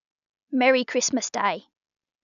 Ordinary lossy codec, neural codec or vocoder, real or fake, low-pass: none; none; real; 7.2 kHz